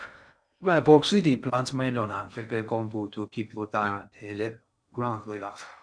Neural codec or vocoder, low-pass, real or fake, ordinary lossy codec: codec, 16 kHz in and 24 kHz out, 0.6 kbps, FocalCodec, streaming, 2048 codes; 9.9 kHz; fake; none